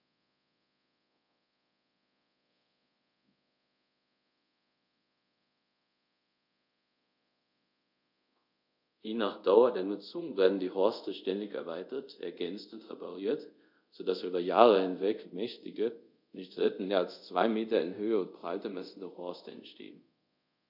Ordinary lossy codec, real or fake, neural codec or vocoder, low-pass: none; fake; codec, 24 kHz, 0.5 kbps, DualCodec; 5.4 kHz